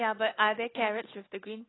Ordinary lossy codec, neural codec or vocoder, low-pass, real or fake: AAC, 16 kbps; none; 7.2 kHz; real